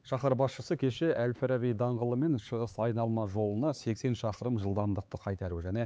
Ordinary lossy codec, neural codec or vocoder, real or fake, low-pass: none; codec, 16 kHz, 4 kbps, X-Codec, HuBERT features, trained on LibriSpeech; fake; none